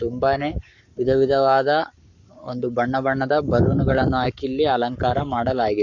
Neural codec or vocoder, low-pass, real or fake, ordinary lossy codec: codec, 44.1 kHz, 7.8 kbps, Pupu-Codec; 7.2 kHz; fake; none